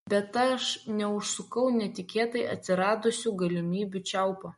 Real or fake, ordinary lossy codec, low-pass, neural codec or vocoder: real; MP3, 48 kbps; 19.8 kHz; none